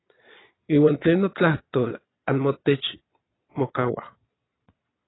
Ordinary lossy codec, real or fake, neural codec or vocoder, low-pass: AAC, 16 kbps; fake; vocoder, 22.05 kHz, 80 mel bands, Vocos; 7.2 kHz